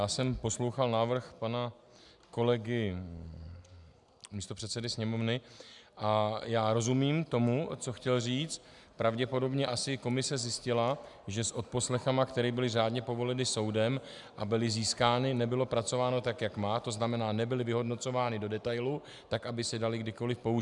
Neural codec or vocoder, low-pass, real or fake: none; 10.8 kHz; real